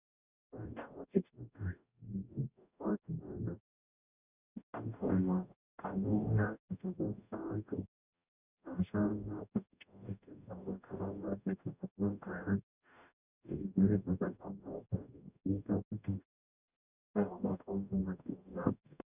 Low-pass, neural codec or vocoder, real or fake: 3.6 kHz; codec, 44.1 kHz, 0.9 kbps, DAC; fake